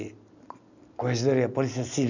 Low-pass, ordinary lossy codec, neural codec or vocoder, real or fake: 7.2 kHz; none; none; real